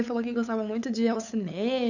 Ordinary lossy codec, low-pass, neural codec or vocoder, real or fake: none; 7.2 kHz; codec, 16 kHz, 8 kbps, FunCodec, trained on LibriTTS, 25 frames a second; fake